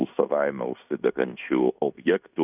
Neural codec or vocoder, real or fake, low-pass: codec, 16 kHz in and 24 kHz out, 0.9 kbps, LongCat-Audio-Codec, fine tuned four codebook decoder; fake; 3.6 kHz